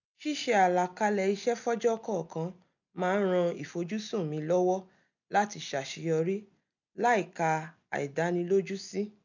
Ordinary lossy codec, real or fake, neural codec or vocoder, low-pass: none; real; none; 7.2 kHz